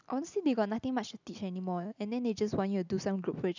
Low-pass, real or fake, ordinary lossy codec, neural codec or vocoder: 7.2 kHz; real; none; none